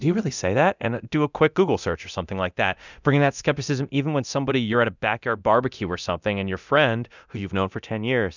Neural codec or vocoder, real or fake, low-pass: codec, 24 kHz, 0.9 kbps, DualCodec; fake; 7.2 kHz